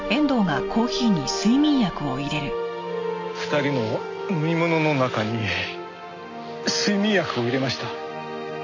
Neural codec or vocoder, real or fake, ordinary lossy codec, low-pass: none; real; MP3, 48 kbps; 7.2 kHz